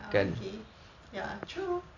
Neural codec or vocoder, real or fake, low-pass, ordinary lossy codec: none; real; 7.2 kHz; none